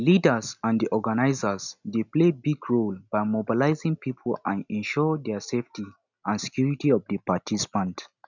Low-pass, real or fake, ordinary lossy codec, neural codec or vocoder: 7.2 kHz; real; none; none